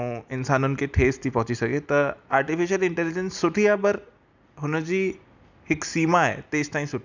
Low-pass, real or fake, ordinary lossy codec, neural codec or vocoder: 7.2 kHz; real; none; none